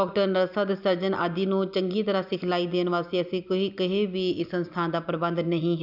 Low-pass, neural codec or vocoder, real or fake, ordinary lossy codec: 5.4 kHz; none; real; none